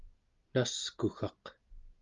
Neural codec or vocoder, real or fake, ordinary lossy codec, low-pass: none; real; Opus, 32 kbps; 7.2 kHz